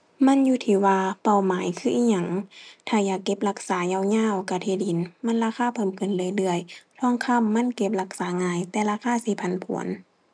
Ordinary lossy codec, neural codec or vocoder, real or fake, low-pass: none; none; real; 9.9 kHz